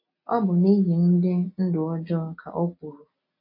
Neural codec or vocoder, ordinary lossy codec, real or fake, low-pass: none; MP3, 32 kbps; real; 5.4 kHz